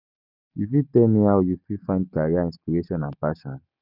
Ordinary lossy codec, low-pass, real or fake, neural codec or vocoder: none; 5.4 kHz; real; none